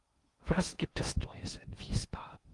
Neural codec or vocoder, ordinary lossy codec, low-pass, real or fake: codec, 16 kHz in and 24 kHz out, 0.8 kbps, FocalCodec, streaming, 65536 codes; Opus, 24 kbps; 10.8 kHz; fake